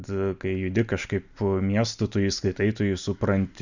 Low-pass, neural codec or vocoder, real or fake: 7.2 kHz; none; real